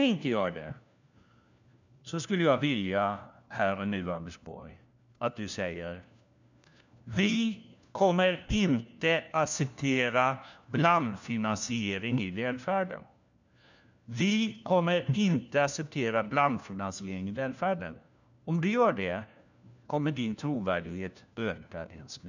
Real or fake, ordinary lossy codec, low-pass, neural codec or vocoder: fake; none; 7.2 kHz; codec, 16 kHz, 1 kbps, FunCodec, trained on LibriTTS, 50 frames a second